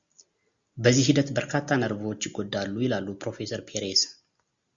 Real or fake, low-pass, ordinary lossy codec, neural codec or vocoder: real; 7.2 kHz; Opus, 64 kbps; none